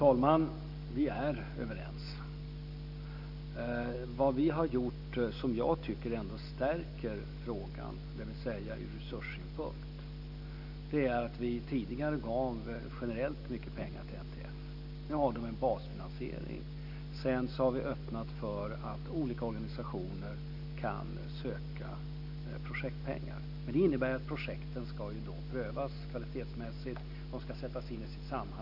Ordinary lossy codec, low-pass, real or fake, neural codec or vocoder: none; 5.4 kHz; real; none